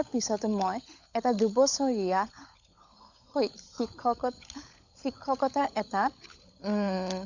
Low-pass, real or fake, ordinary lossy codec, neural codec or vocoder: 7.2 kHz; fake; none; codec, 16 kHz, 16 kbps, FunCodec, trained on LibriTTS, 50 frames a second